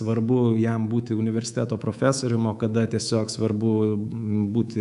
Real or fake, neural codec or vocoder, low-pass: fake; codec, 24 kHz, 3.1 kbps, DualCodec; 10.8 kHz